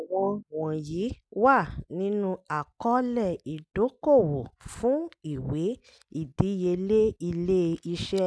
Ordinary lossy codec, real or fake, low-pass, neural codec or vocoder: none; real; none; none